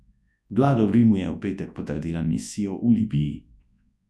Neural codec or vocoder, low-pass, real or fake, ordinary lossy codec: codec, 24 kHz, 0.9 kbps, WavTokenizer, large speech release; none; fake; none